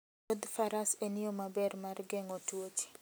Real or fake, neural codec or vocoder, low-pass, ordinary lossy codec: real; none; none; none